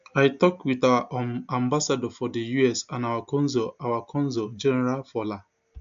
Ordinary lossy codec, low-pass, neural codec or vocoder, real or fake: AAC, 64 kbps; 7.2 kHz; none; real